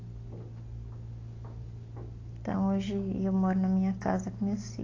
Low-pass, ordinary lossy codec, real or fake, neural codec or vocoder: 7.2 kHz; AAC, 32 kbps; real; none